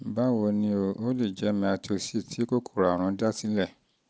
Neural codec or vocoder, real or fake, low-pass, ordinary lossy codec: none; real; none; none